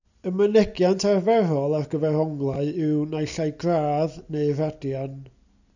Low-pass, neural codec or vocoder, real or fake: 7.2 kHz; none; real